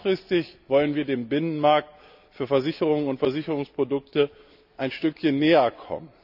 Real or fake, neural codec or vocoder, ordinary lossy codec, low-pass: real; none; none; 5.4 kHz